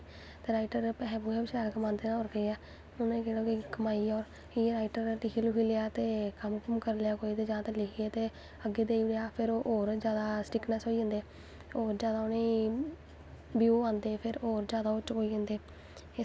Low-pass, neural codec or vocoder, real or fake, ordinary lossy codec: none; none; real; none